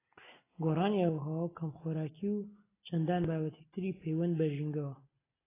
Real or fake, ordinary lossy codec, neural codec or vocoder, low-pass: real; AAC, 16 kbps; none; 3.6 kHz